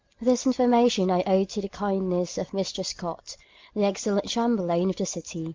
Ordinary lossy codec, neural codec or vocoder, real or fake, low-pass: Opus, 24 kbps; none; real; 7.2 kHz